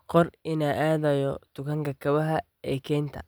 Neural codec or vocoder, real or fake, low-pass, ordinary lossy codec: none; real; none; none